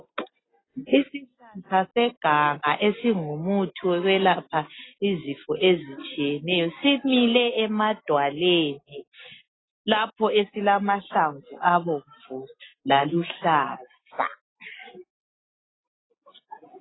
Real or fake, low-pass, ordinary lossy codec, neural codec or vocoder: real; 7.2 kHz; AAC, 16 kbps; none